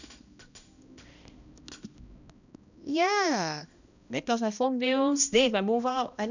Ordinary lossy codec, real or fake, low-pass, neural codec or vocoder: none; fake; 7.2 kHz; codec, 16 kHz, 1 kbps, X-Codec, HuBERT features, trained on balanced general audio